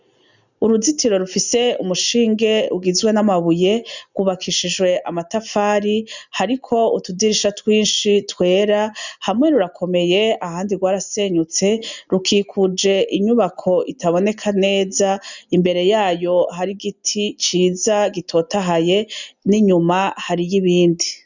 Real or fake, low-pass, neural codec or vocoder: real; 7.2 kHz; none